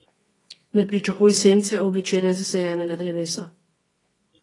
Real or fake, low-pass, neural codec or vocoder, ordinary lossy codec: fake; 10.8 kHz; codec, 24 kHz, 0.9 kbps, WavTokenizer, medium music audio release; AAC, 32 kbps